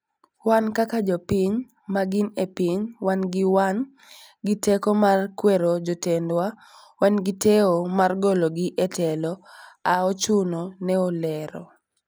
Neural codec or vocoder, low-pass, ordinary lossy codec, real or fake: none; none; none; real